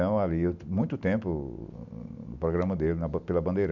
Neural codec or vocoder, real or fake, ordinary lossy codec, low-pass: none; real; none; 7.2 kHz